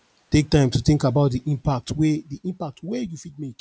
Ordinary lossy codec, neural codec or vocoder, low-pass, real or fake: none; none; none; real